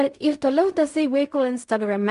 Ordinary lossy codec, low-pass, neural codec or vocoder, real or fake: MP3, 96 kbps; 10.8 kHz; codec, 16 kHz in and 24 kHz out, 0.4 kbps, LongCat-Audio-Codec, fine tuned four codebook decoder; fake